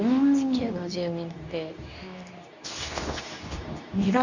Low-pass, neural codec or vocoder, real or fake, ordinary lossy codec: 7.2 kHz; codec, 24 kHz, 0.9 kbps, WavTokenizer, medium speech release version 2; fake; none